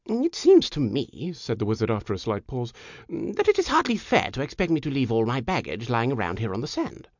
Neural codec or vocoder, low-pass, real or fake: none; 7.2 kHz; real